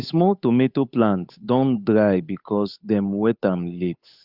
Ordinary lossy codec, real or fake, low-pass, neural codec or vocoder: Opus, 64 kbps; fake; 5.4 kHz; codec, 16 kHz, 8 kbps, FunCodec, trained on Chinese and English, 25 frames a second